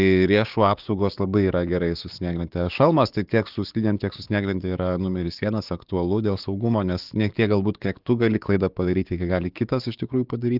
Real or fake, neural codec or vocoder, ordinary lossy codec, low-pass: fake; codec, 16 kHz, 6 kbps, DAC; Opus, 32 kbps; 5.4 kHz